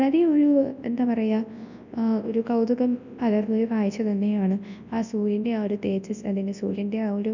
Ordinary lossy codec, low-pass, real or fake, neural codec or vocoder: none; 7.2 kHz; fake; codec, 24 kHz, 0.9 kbps, WavTokenizer, large speech release